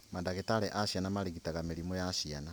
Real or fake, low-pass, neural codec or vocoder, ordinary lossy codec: real; none; none; none